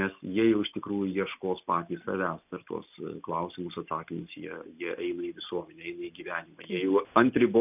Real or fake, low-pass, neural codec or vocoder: real; 3.6 kHz; none